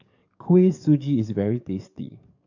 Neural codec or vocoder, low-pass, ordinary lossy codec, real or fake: codec, 44.1 kHz, 7.8 kbps, DAC; 7.2 kHz; none; fake